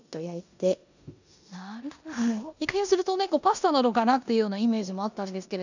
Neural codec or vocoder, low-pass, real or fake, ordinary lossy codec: codec, 16 kHz in and 24 kHz out, 0.9 kbps, LongCat-Audio-Codec, four codebook decoder; 7.2 kHz; fake; none